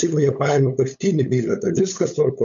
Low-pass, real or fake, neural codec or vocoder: 7.2 kHz; fake; codec, 16 kHz, 8 kbps, FunCodec, trained on LibriTTS, 25 frames a second